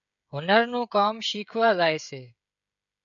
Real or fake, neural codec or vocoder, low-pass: fake; codec, 16 kHz, 16 kbps, FreqCodec, smaller model; 7.2 kHz